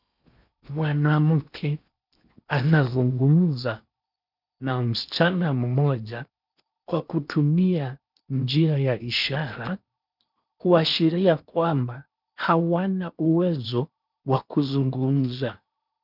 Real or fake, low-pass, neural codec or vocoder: fake; 5.4 kHz; codec, 16 kHz in and 24 kHz out, 0.8 kbps, FocalCodec, streaming, 65536 codes